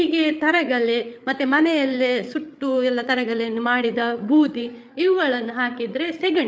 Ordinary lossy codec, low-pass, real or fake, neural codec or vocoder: none; none; fake; codec, 16 kHz, 8 kbps, FreqCodec, larger model